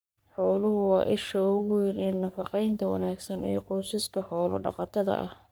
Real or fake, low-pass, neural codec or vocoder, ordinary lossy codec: fake; none; codec, 44.1 kHz, 3.4 kbps, Pupu-Codec; none